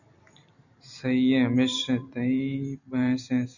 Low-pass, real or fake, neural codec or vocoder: 7.2 kHz; fake; vocoder, 44.1 kHz, 128 mel bands every 256 samples, BigVGAN v2